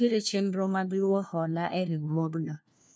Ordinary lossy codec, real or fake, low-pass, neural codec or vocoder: none; fake; none; codec, 16 kHz, 1 kbps, FunCodec, trained on LibriTTS, 50 frames a second